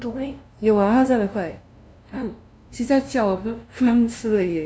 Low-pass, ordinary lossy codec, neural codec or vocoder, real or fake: none; none; codec, 16 kHz, 0.5 kbps, FunCodec, trained on LibriTTS, 25 frames a second; fake